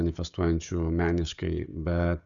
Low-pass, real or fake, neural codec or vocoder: 7.2 kHz; fake; codec, 16 kHz, 16 kbps, FreqCodec, smaller model